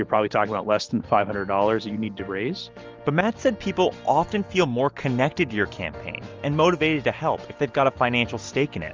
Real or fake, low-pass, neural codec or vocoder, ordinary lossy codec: real; 7.2 kHz; none; Opus, 32 kbps